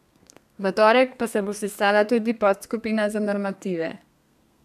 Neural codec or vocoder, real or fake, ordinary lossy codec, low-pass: codec, 32 kHz, 1.9 kbps, SNAC; fake; none; 14.4 kHz